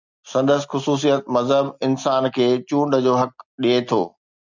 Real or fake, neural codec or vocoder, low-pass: real; none; 7.2 kHz